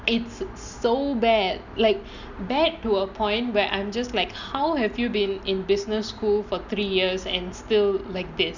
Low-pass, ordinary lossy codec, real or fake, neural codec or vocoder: 7.2 kHz; none; real; none